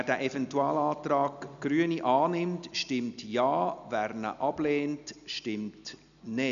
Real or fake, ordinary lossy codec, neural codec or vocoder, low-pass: real; none; none; 7.2 kHz